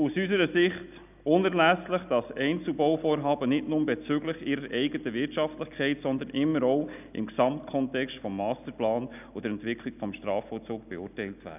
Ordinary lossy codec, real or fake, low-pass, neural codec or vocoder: none; real; 3.6 kHz; none